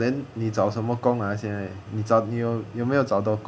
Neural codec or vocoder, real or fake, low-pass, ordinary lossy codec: none; real; none; none